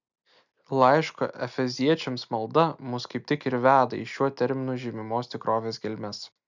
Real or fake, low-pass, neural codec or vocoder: real; 7.2 kHz; none